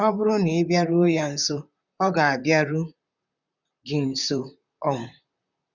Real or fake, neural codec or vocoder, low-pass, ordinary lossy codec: fake; vocoder, 44.1 kHz, 128 mel bands, Pupu-Vocoder; 7.2 kHz; none